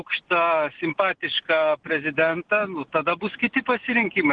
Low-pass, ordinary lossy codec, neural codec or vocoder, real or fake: 9.9 kHz; Opus, 16 kbps; none; real